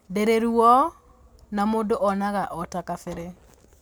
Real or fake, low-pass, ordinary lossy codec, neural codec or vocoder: real; none; none; none